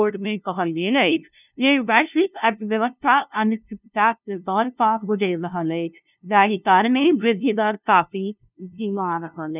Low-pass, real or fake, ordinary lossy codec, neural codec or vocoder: 3.6 kHz; fake; none; codec, 16 kHz, 0.5 kbps, FunCodec, trained on LibriTTS, 25 frames a second